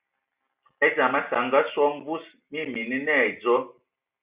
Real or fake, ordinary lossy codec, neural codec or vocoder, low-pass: real; Opus, 64 kbps; none; 3.6 kHz